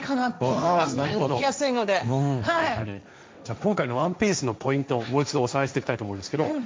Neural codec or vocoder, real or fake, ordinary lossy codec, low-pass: codec, 16 kHz, 1.1 kbps, Voila-Tokenizer; fake; none; none